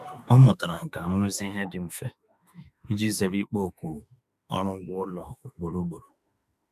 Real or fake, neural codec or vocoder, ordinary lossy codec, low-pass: fake; autoencoder, 48 kHz, 32 numbers a frame, DAC-VAE, trained on Japanese speech; none; 14.4 kHz